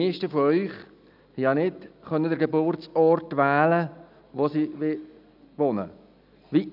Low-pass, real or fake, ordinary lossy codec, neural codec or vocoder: 5.4 kHz; real; none; none